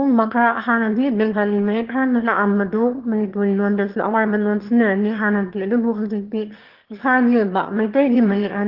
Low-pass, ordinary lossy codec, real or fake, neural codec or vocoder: 5.4 kHz; Opus, 16 kbps; fake; autoencoder, 22.05 kHz, a latent of 192 numbers a frame, VITS, trained on one speaker